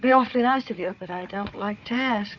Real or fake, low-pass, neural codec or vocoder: fake; 7.2 kHz; codec, 16 kHz, 4 kbps, FunCodec, trained on Chinese and English, 50 frames a second